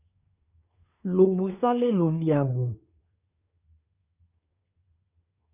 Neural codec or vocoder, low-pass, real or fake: codec, 24 kHz, 1 kbps, SNAC; 3.6 kHz; fake